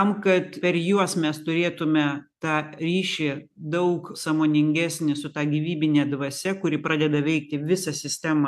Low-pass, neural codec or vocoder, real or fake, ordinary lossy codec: 14.4 kHz; none; real; AAC, 96 kbps